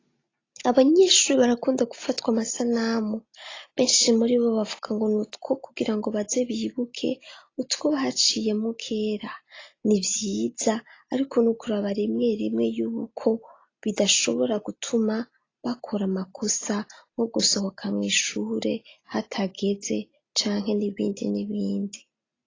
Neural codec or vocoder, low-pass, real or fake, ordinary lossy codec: none; 7.2 kHz; real; AAC, 32 kbps